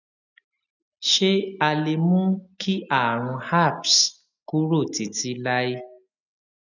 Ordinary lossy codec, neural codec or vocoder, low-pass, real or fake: none; none; 7.2 kHz; real